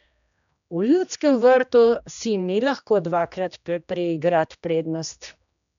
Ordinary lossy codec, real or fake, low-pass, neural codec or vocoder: none; fake; 7.2 kHz; codec, 16 kHz, 1 kbps, X-Codec, HuBERT features, trained on general audio